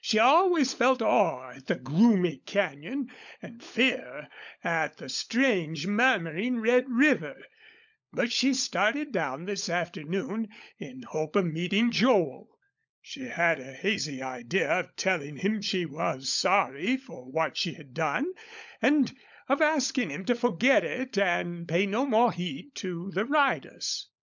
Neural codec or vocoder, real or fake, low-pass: codec, 16 kHz, 16 kbps, FunCodec, trained on LibriTTS, 50 frames a second; fake; 7.2 kHz